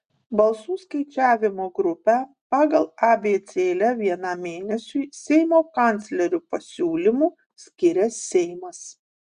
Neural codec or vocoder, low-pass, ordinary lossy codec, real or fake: none; 9.9 kHz; Opus, 64 kbps; real